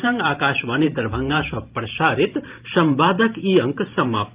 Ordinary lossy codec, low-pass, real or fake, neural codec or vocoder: Opus, 24 kbps; 3.6 kHz; fake; vocoder, 44.1 kHz, 128 mel bands every 512 samples, BigVGAN v2